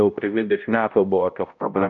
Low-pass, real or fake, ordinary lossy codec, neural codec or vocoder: 7.2 kHz; fake; MP3, 64 kbps; codec, 16 kHz, 0.5 kbps, X-Codec, HuBERT features, trained on balanced general audio